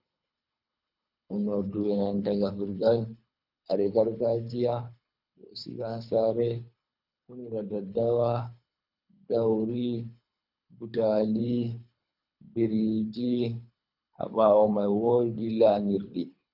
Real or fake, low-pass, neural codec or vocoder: fake; 5.4 kHz; codec, 24 kHz, 3 kbps, HILCodec